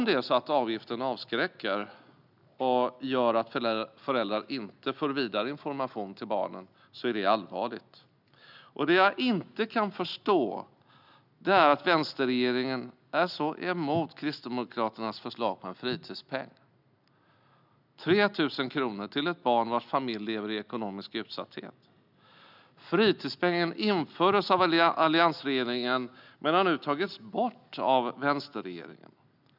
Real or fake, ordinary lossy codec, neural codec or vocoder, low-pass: real; none; none; 5.4 kHz